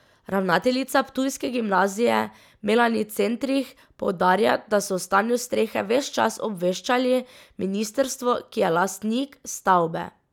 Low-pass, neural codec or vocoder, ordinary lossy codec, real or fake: 19.8 kHz; none; none; real